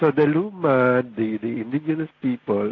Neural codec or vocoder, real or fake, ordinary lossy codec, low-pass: none; real; AAC, 32 kbps; 7.2 kHz